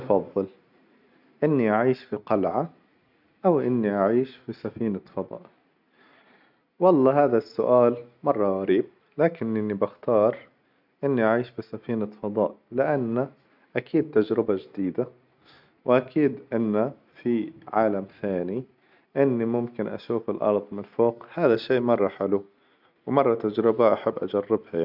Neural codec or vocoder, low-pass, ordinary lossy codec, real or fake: none; 5.4 kHz; none; real